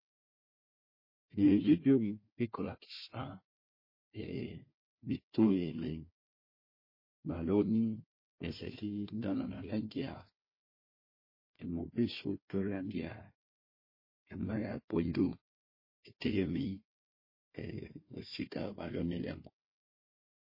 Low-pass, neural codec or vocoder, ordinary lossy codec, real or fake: 5.4 kHz; codec, 16 kHz, 1 kbps, FunCodec, trained on Chinese and English, 50 frames a second; MP3, 24 kbps; fake